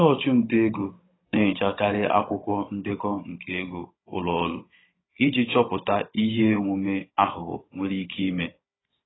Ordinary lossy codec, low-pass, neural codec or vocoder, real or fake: AAC, 16 kbps; 7.2 kHz; codec, 16 kHz, 16 kbps, FunCodec, trained on Chinese and English, 50 frames a second; fake